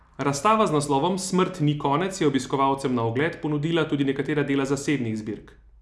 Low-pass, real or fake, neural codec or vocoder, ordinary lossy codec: none; real; none; none